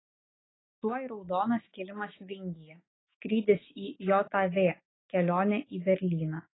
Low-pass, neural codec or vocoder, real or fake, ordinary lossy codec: 7.2 kHz; autoencoder, 48 kHz, 128 numbers a frame, DAC-VAE, trained on Japanese speech; fake; AAC, 16 kbps